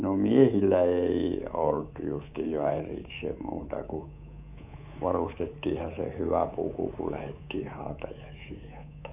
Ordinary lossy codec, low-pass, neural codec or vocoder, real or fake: AAC, 32 kbps; 3.6 kHz; codec, 24 kHz, 3.1 kbps, DualCodec; fake